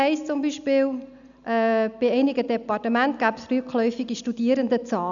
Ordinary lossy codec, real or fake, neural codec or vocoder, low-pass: none; real; none; 7.2 kHz